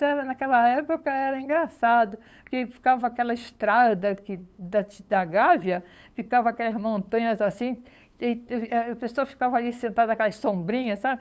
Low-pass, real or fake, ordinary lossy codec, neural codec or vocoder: none; fake; none; codec, 16 kHz, 8 kbps, FunCodec, trained on LibriTTS, 25 frames a second